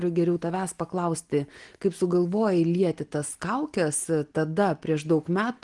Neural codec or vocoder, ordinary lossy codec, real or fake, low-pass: none; Opus, 24 kbps; real; 10.8 kHz